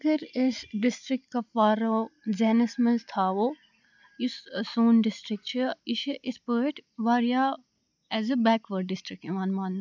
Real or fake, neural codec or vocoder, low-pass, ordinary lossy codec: real; none; 7.2 kHz; none